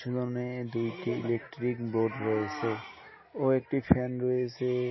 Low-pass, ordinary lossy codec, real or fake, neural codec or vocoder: 7.2 kHz; MP3, 24 kbps; real; none